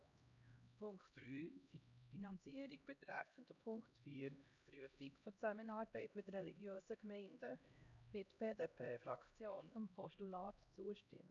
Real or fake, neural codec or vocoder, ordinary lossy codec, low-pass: fake; codec, 16 kHz, 1 kbps, X-Codec, HuBERT features, trained on LibriSpeech; none; 7.2 kHz